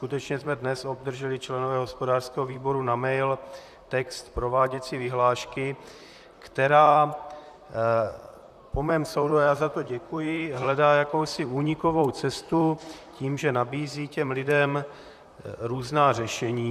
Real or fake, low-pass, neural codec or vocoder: fake; 14.4 kHz; vocoder, 44.1 kHz, 128 mel bands, Pupu-Vocoder